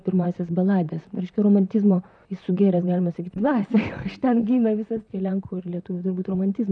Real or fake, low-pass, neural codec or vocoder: fake; 9.9 kHz; vocoder, 44.1 kHz, 128 mel bands, Pupu-Vocoder